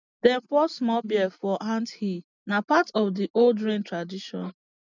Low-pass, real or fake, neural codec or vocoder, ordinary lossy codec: 7.2 kHz; real; none; none